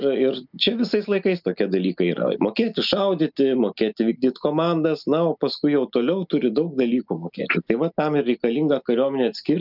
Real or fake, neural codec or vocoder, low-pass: real; none; 5.4 kHz